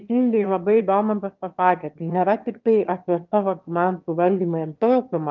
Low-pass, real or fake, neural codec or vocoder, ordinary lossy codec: 7.2 kHz; fake; autoencoder, 22.05 kHz, a latent of 192 numbers a frame, VITS, trained on one speaker; Opus, 24 kbps